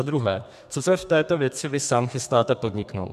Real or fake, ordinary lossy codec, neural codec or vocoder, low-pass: fake; MP3, 96 kbps; codec, 32 kHz, 1.9 kbps, SNAC; 14.4 kHz